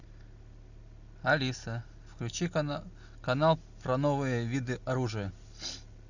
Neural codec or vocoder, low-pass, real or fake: none; 7.2 kHz; real